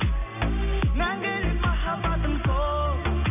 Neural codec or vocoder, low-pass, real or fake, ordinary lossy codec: none; 3.6 kHz; real; none